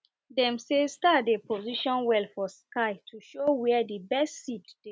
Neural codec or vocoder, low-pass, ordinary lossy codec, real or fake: none; none; none; real